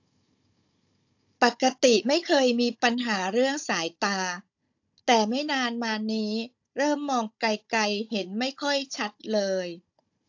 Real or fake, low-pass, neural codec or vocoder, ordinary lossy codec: fake; 7.2 kHz; codec, 16 kHz, 16 kbps, FunCodec, trained on Chinese and English, 50 frames a second; AAC, 48 kbps